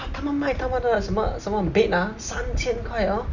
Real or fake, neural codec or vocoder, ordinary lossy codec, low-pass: real; none; none; 7.2 kHz